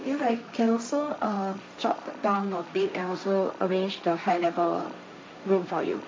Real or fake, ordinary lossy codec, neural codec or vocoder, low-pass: fake; none; codec, 16 kHz, 1.1 kbps, Voila-Tokenizer; none